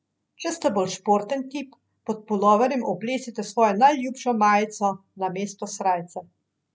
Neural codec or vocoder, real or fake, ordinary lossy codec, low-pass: none; real; none; none